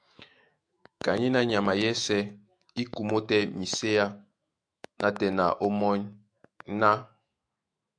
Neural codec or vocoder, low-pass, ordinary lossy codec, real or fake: autoencoder, 48 kHz, 128 numbers a frame, DAC-VAE, trained on Japanese speech; 9.9 kHz; AAC, 64 kbps; fake